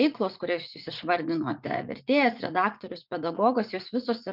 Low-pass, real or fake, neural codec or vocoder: 5.4 kHz; fake; vocoder, 44.1 kHz, 80 mel bands, Vocos